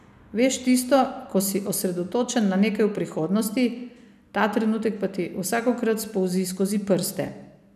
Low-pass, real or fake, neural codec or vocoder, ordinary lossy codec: 14.4 kHz; real; none; none